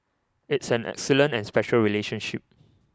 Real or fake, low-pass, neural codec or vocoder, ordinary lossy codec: real; none; none; none